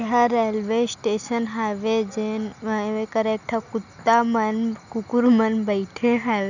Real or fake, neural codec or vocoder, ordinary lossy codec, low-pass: real; none; none; 7.2 kHz